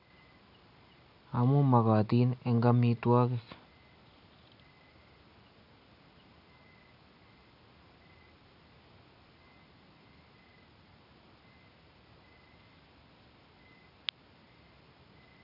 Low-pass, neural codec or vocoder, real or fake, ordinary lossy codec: 5.4 kHz; none; real; none